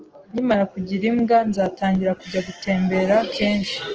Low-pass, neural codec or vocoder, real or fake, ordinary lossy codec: 7.2 kHz; none; real; Opus, 16 kbps